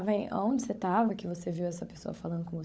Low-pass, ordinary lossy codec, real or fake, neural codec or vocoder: none; none; fake; codec, 16 kHz, 16 kbps, FunCodec, trained on LibriTTS, 50 frames a second